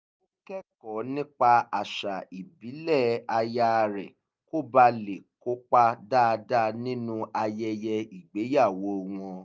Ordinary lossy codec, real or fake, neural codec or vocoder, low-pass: none; real; none; none